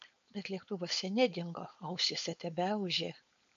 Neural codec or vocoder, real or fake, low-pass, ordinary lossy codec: codec, 16 kHz, 4.8 kbps, FACodec; fake; 7.2 kHz; MP3, 48 kbps